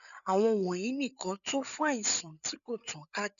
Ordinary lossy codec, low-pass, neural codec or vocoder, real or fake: none; 7.2 kHz; codec, 16 kHz, 8 kbps, FreqCodec, larger model; fake